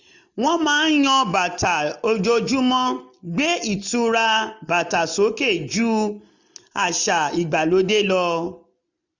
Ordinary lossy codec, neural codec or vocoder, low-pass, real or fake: none; none; 7.2 kHz; real